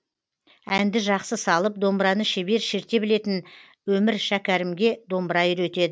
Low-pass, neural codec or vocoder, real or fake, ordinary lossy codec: none; none; real; none